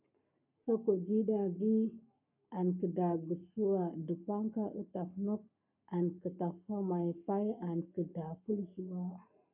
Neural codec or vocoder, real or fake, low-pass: codec, 44.1 kHz, 7.8 kbps, Pupu-Codec; fake; 3.6 kHz